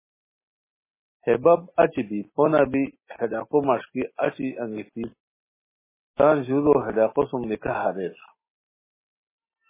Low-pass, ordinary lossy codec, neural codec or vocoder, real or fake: 3.6 kHz; MP3, 16 kbps; none; real